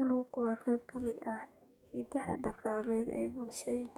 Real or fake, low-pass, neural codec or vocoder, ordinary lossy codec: fake; 19.8 kHz; codec, 44.1 kHz, 2.6 kbps, DAC; none